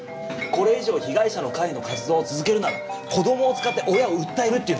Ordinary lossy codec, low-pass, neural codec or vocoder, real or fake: none; none; none; real